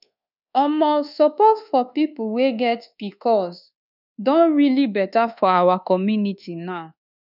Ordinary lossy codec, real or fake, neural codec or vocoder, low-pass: none; fake; codec, 24 kHz, 1.2 kbps, DualCodec; 5.4 kHz